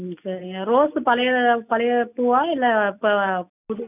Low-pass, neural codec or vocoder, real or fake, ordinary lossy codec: 3.6 kHz; none; real; none